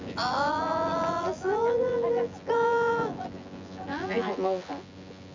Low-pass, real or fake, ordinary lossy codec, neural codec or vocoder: 7.2 kHz; fake; MP3, 64 kbps; vocoder, 24 kHz, 100 mel bands, Vocos